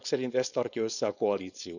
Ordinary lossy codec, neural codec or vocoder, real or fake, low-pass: none; codec, 16 kHz, 4.8 kbps, FACodec; fake; 7.2 kHz